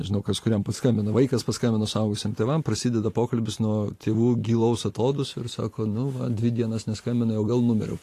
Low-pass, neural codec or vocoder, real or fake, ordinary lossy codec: 14.4 kHz; vocoder, 44.1 kHz, 128 mel bands every 512 samples, BigVGAN v2; fake; AAC, 48 kbps